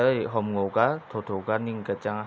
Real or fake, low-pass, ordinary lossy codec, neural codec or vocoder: real; none; none; none